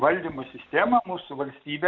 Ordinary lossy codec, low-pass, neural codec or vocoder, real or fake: AAC, 48 kbps; 7.2 kHz; none; real